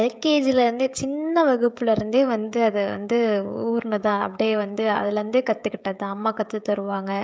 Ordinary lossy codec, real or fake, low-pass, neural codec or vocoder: none; fake; none; codec, 16 kHz, 16 kbps, FreqCodec, smaller model